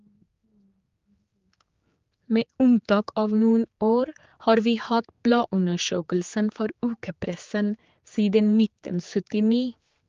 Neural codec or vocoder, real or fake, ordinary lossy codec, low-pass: codec, 16 kHz, 4 kbps, X-Codec, HuBERT features, trained on general audio; fake; Opus, 32 kbps; 7.2 kHz